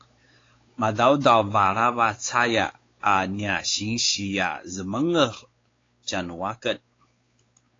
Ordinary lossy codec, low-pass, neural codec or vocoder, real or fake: AAC, 32 kbps; 7.2 kHz; codec, 16 kHz, 4 kbps, X-Codec, WavLM features, trained on Multilingual LibriSpeech; fake